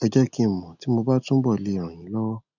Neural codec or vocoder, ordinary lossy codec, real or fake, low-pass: none; none; real; 7.2 kHz